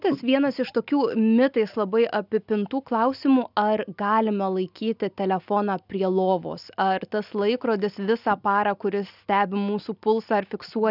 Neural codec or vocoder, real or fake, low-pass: none; real; 5.4 kHz